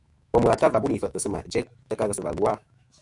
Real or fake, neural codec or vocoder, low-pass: fake; autoencoder, 48 kHz, 128 numbers a frame, DAC-VAE, trained on Japanese speech; 10.8 kHz